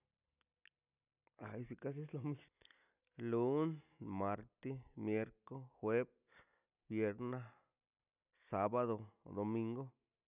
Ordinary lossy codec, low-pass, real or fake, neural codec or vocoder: none; 3.6 kHz; real; none